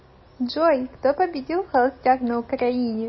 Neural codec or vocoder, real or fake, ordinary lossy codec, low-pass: none; real; MP3, 24 kbps; 7.2 kHz